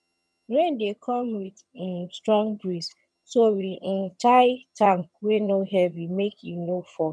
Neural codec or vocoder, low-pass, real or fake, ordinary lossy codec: vocoder, 22.05 kHz, 80 mel bands, HiFi-GAN; none; fake; none